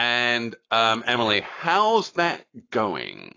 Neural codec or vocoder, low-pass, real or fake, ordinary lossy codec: codec, 44.1 kHz, 7.8 kbps, Pupu-Codec; 7.2 kHz; fake; AAC, 32 kbps